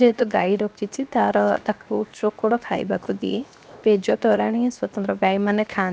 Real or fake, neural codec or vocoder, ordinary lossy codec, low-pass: fake; codec, 16 kHz, 0.7 kbps, FocalCodec; none; none